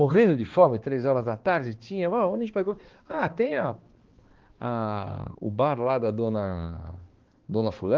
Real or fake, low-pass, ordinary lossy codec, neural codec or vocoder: fake; 7.2 kHz; Opus, 16 kbps; codec, 16 kHz, 2 kbps, X-Codec, HuBERT features, trained on balanced general audio